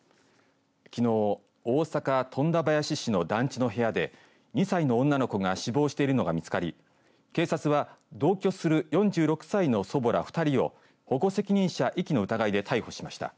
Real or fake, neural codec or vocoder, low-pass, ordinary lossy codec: real; none; none; none